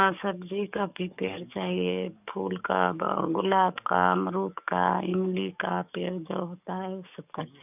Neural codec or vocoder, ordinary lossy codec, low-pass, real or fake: codec, 44.1 kHz, 7.8 kbps, DAC; none; 3.6 kHz; fake